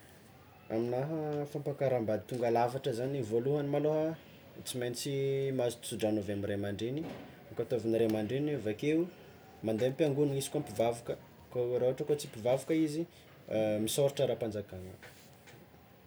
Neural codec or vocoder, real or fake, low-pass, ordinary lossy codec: none; real; none; none